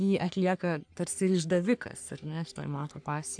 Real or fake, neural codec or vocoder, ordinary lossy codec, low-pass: fake; codec, 44.1 kHz, 3.4 kbps, Pupu-Codec; AAC, 64 kbps; 9.9 kHz